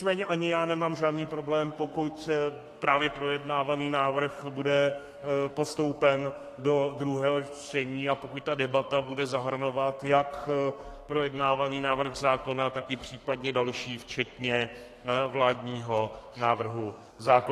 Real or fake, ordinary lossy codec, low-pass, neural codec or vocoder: fake; AAC, 48 kbps; 14.4 kHz; codec, 32 kHz, 1.9 kbps, SNAC